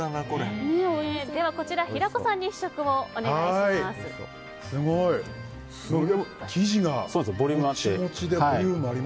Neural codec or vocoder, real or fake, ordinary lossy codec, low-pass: none; real; none; none